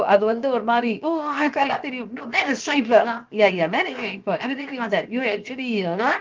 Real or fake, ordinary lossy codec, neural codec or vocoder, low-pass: fake; Opus, 32 kbps; codec, 16 kHz, 0.7 kbps, FocalCodec; 7.2 kHz